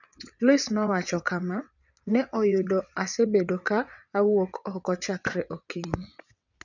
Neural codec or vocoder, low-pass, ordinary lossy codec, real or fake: vocoder, 22.05 kHz, 80 mel bands, WaveNeXt; 7.2 kHz; none; fake